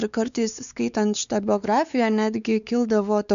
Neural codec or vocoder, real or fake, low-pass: codec, 16 kHz, 4 kbps, X-Codec, WavLM features, trained on Multilingual LibriSpeech; fake; 7.2 kHz